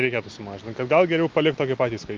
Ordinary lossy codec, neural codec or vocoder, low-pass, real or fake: Opus, 24 kbps; none; 7.2 kHz; real